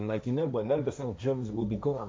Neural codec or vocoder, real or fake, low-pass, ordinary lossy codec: codec, 16 kHz, 1.1 kbps, Voila-Tokenizer; fake; none; none